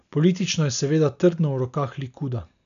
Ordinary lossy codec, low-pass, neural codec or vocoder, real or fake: none; 7.2 kHz; none; real